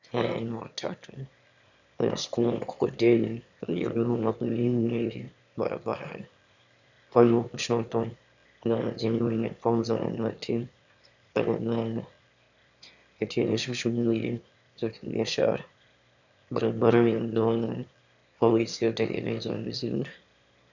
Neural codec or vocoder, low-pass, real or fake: autoencoder, 22.05 kHz, a latent of 192 numbers a frame, VITS, trained on one speaker; 7.2 kHz; fake